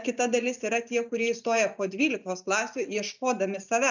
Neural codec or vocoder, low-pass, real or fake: none; 7.2 kHz; real